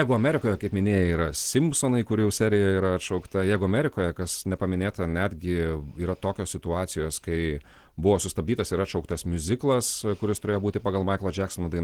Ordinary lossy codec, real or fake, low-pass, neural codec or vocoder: Opus, 16 kbps; real; 19.8 kHz; none